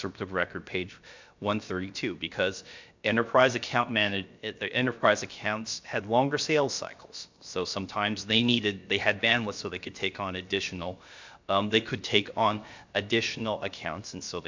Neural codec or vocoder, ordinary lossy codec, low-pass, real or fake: codec, 16 kHz, about 1 kbps, DyCAST, with the encoder's durations; MP3, 64 kbps; 7.2 kHz; fake